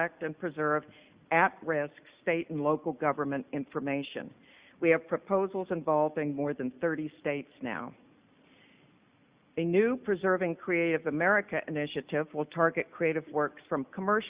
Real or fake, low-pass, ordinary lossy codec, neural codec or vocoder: real; 3.6 kHz; Opus, 64 kbps; none